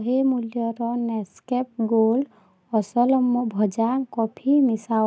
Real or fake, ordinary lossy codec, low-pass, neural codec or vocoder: real; none; none; none